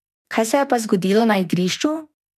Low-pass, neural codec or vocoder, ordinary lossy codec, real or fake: 14.4 kHz; autoencoder, 48 kHz, 32 numbers a frame, DAC-VAE, trained on Japanese speech; none; fake